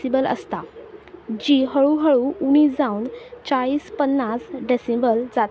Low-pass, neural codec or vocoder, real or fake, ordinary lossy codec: none; none; real; none